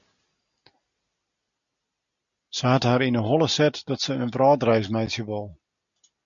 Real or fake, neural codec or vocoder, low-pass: real; none; 7.2 kHz